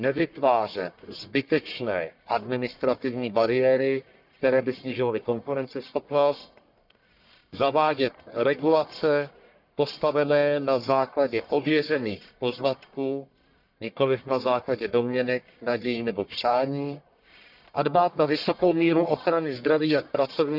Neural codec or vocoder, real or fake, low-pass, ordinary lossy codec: codec, 44.1 kHz, 1.7 kbps, Pupu-Codec; fake; 5.4 kHz; none